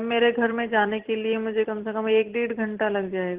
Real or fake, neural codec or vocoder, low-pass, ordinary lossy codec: real; none; 3.6 kHz; Opus, 16 kbps